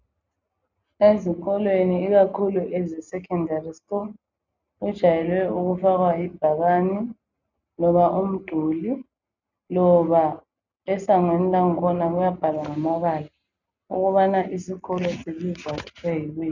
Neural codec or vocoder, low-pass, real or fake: none; 7.2 kHz; real